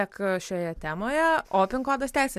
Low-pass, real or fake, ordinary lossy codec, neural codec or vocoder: 14.4 kHz; real; MP3, 96 kbps; none